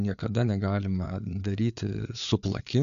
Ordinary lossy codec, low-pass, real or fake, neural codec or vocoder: AAC, 96 kbps; 7.2 kHz; fake; codec, 16 kHz, 4 kbps, FreqCodec, larger model